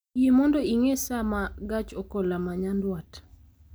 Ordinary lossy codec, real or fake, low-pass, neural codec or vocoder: none; real; none; none